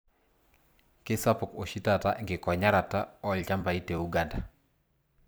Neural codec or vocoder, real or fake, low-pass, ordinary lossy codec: vocoder, 44.1 kHz, 128 mel bands every 256 samples, BigVGAN v2; fake; none; none